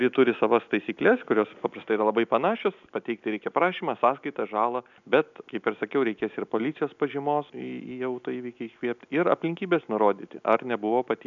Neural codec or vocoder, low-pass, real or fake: none; 7.2 kHz; real